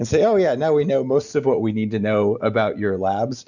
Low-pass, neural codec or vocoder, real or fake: 7.2 kHz; none; real